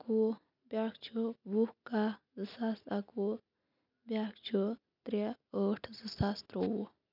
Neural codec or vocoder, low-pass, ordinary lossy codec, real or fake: none; 5.4 kHz; none; real